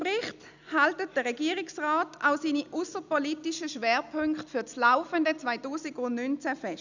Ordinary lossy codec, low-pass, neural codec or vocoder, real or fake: none; 7.2 kHz; none; real